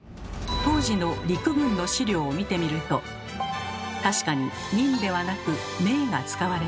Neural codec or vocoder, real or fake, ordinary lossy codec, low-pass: none; real; none; none